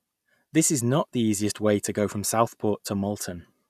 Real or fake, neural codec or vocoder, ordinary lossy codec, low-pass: real; none; none; 14.4 kHz